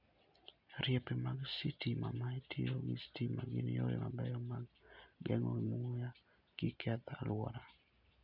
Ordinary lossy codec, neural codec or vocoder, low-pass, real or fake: none; none; 5.4 kHz; real